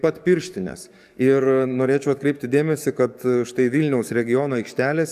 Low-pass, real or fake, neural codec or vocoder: 14.4 kHz; fake; codec, 44.1 kHz, 7.8 kbps, DAC